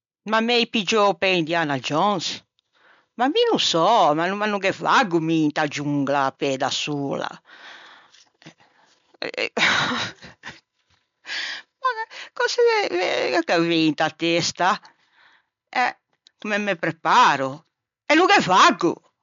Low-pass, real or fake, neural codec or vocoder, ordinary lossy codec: 7.2 kHz; real; none; MP3, 64 kbps